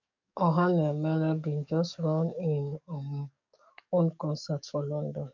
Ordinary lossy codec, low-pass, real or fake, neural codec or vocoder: MP3, 64 kbps; 7.2 kHz; fake; codec, 44.1 kHz, 7.8 kbps, DAC